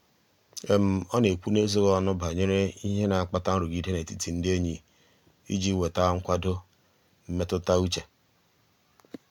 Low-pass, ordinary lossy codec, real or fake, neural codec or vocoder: 19.8 kHz; MP3, 96 kbps; real; none